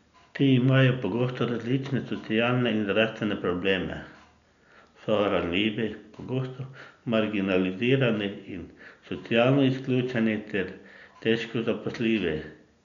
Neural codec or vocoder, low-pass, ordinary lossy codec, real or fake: none; 7.2 kHz; none; real